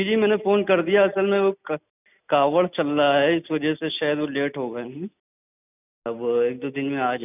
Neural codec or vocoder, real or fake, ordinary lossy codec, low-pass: none; real; none; 3.6 kHz